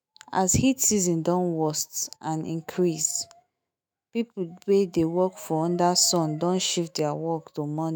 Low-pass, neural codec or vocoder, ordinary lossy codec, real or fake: none; autoencoder, 48 kHz, 128 numbers a frame, DAC-VAE, trained on Japanese speech; none; fake